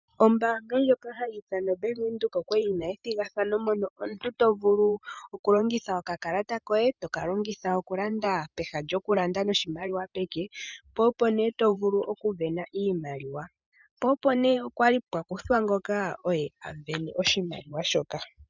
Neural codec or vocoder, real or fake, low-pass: vocoder, 44.1 kHz, 128 mel bands every 512 samples, BigVGAN v2; fake; 7.2 kHz